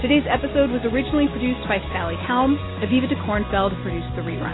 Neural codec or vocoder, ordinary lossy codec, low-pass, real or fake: none; AAC, 16 kbps; 7.2 kHz; real